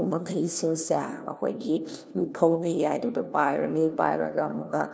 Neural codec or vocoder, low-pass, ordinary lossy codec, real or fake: codec, 16 kHz, 1 kbps, FunCodec, trained on LibriTTS, 50 frames a second; none; none; fake